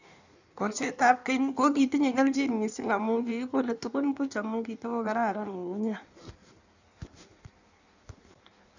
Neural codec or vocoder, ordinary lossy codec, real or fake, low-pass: codec, 16 kHz in and 24 kHz out, 1.1 kbps, FireRedTTS-2 codec; none; fake; 7.2 kHz